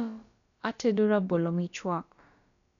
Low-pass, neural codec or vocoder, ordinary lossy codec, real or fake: 7.2 kHz; codec, 16 kHz, about 1 kbps, DyCAST, with the encoder's durations; none; fake